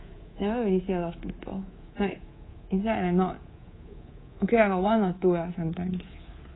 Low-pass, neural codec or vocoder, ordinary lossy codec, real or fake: 7.2 kHz; codec, 24 kHz, 3.1 kbps, DualCodec; AAC, 16 kbps; fake